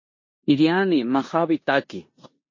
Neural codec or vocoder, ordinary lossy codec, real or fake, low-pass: codec, 16 kHz in and 24 kHz out, 0.9 kbps, LongCat-Audio-Codec, four codebook decoder; MP3, 32 kbps; fake; 7.2 kHz